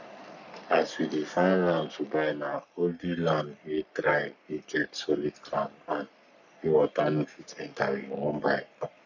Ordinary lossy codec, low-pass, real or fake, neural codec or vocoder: none; 7.2 kHz; fake; codec, 44.1 kHz, 3.4 kbps, Pupu-Codec